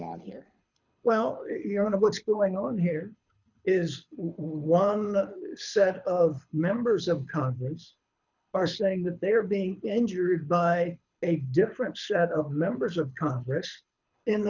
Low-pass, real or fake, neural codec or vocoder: 7.2 kHz; fake; codec, 24 kHz, 6 kbps, HILCodec